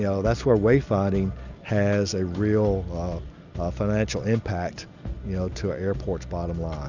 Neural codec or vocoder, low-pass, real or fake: none; 7.2 kHz; real